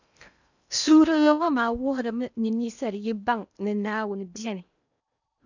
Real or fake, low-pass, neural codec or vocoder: fake; 7.2 kHz; codec, 16 kHz in and 24 kHz out, 0.8 kbps, FocalCodec, streaming, 65536 codes